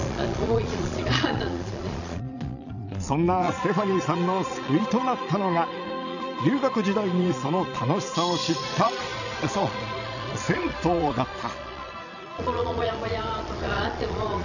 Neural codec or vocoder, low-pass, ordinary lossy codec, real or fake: vocoder, 22.05 kHz, 80 mel bands, Vocos; 7.2 kHz; none; fake